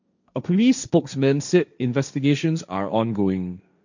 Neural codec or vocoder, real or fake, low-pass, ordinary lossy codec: codec, 16 kHz, 1.1 kbps, Voila-Tokenizer; fake; 7.2 kHz; none